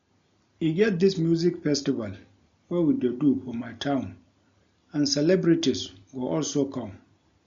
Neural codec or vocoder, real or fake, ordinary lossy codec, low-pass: none; real; MP3, 48 kbps; 7.2 kHz